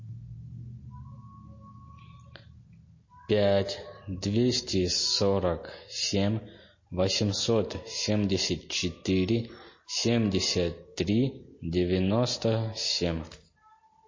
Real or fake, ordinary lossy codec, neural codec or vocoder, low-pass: real; MP3, 32 kbps; none; 7.2 kHz